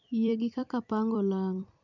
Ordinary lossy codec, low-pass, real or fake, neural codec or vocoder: none; 7.2 kHz; fake; vocoder, 44.1 kHz, 128 mel bands every 256 samples, BigVGAN v2